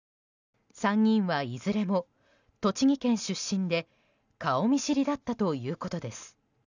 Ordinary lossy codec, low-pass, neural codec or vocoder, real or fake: none; 7.2 kHz; none; real